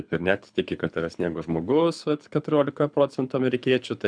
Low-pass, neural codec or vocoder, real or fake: 9.9 kHz; codec, 24 kHz, 6 kbps, HILCodec; fake